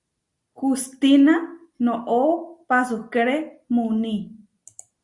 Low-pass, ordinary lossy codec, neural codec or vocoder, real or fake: 10.8 kHz; Opus, 64 kbps; none; real